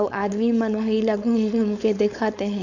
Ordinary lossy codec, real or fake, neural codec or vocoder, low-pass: none; fake; codec, 16 kHz, 4.8 kbps, FACodec; 7.2 kHz